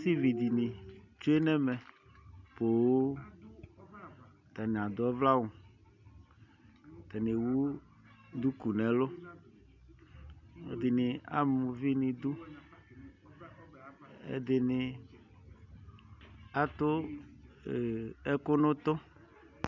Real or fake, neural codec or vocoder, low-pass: real; none; 7.2 kHz